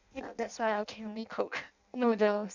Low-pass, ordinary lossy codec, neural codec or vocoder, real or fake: 7.2 kHz; none; codec, 16 kHz in and 24 kHz out, 0.6 kbps, FireRedTTS-2 codec; fake